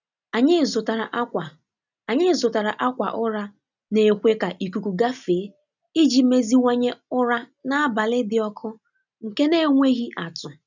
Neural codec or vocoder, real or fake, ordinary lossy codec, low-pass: none; real; none; 7.2 kHz